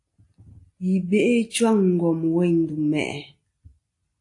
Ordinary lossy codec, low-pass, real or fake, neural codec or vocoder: AAC, 48 kbps; 10.8 kHz; real; none